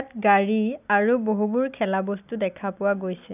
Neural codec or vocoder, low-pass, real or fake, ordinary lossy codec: none; 3.6 kHz; real; none